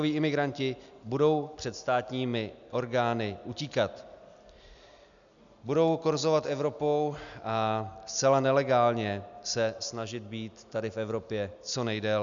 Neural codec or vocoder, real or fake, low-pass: none; real; 7.2 kHz